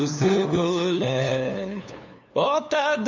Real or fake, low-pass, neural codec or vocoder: fake; 7.2 kHz; codec, 16 kHz, 2 kbps, FunCodec, trained on LibriTTS, 25 frames a second